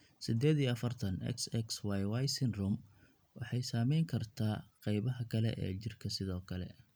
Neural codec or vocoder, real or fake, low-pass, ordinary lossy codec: none; real; none; none